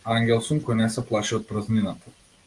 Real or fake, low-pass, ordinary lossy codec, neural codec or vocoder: real; 10.8 kHz; Opus, 24 kbps; none